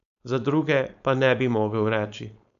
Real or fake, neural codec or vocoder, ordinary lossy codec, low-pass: fake; codec, 16 kHz, 4.8 kbps, FACodec; none; 7.2 kHz